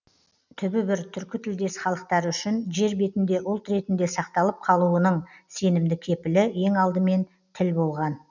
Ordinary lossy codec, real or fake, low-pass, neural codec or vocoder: none; real; 7.2 kHz; none